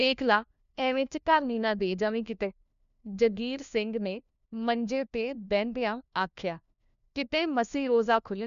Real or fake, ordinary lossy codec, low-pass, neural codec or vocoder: fake; none; 7.2 kHz; codec, 16 kHz, 1 kbps, FunCodec, trained on LibriTTS, 50 frames a second